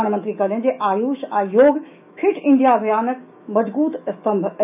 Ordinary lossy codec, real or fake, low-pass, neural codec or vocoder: none; fake; 3.6 kHz; autoencoder, 48 kHz, 128 numbers a frame, DAC-VAE, trained on Japanese speech